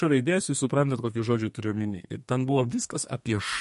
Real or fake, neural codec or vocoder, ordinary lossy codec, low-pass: fake; codec, 32 kHz, 1.9 kbps, SNAC; MP3, 48 kbps; 14.4 kHz